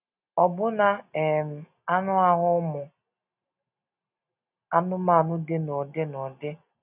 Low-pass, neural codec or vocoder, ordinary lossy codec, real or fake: 3.6 kHz; none; none; real